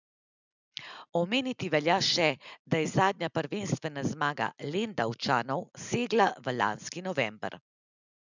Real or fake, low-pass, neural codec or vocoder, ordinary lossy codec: real; 7.2 kHz; none; none